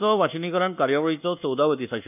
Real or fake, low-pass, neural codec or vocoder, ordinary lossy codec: fake; 3.6 kHz; codec, 24 kHz, 1.2 kbps, DualCodec; none